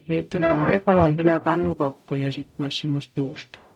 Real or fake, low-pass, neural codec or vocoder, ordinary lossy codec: fake; 19.8 kHz; codec, 44.1 kHz, 0.9 kbps, DAC; MP3, 96 kbps